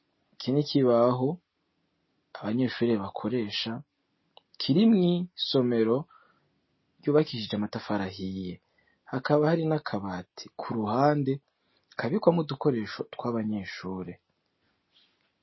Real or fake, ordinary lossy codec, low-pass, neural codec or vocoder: real; MP3, 24 kbps; 7.2 kHz; none